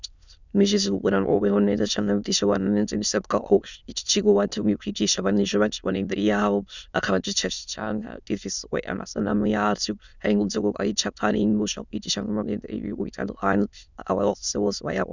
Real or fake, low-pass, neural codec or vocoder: fake; 7.2 kHz; autoencoder, 22.05 kHz, a latent of 192 numbers a frame, VITS, trained on many speakers